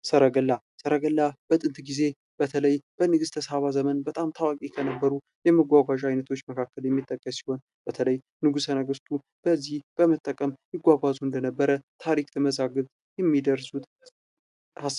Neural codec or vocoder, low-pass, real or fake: none; 10.8 kHz; real